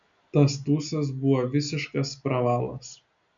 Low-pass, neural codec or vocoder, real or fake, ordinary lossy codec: 7.2 kHz; none; real; MP3, 96 kbps